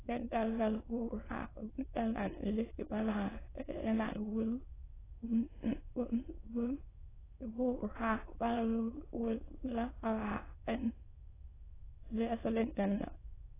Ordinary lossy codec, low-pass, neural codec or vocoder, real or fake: AAC, 16 kbps; 3.6 kHz; autoencoder, 22.05 kHz, a latent of 192 numbers a frame, VITS, trained on many speakers; fake